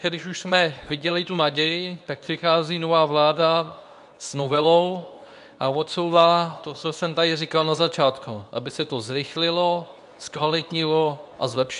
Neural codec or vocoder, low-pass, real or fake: codec, 24 kHz, 0.9 kbps, WavTokenizer, medium speech release version 2; 10.8 kHz; fake